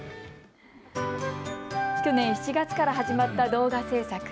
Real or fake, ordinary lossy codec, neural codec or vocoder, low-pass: real; none; none; none